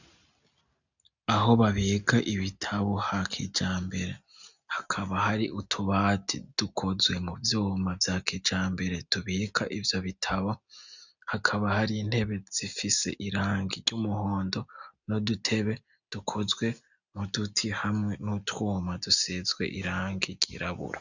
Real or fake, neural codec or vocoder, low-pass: real; none; 7.2 kHz